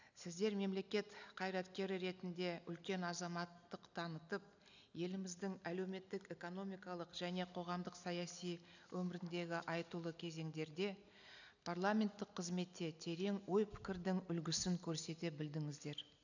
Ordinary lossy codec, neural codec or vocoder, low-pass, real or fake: none; none; 7.2 kHz; real